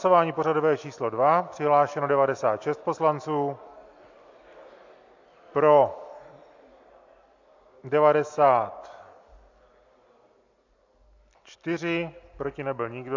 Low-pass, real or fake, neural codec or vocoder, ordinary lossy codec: 7.2 kHz; real; none; AAC, 48 kbps